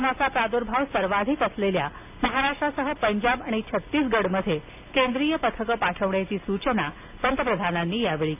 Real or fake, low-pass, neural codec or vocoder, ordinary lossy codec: real; 3.6 kHz; none; AAC, 32 kbps